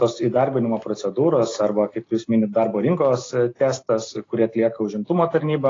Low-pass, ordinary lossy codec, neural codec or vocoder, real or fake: 7.2 kHz; AAC, 32 kbps; none; real